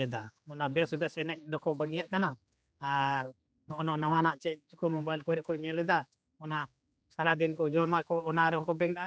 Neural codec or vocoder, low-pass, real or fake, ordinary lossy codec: codec, 16 kHz, 2 kbps, X-Codec, HuBERT features, trained on general audio; none; fake; none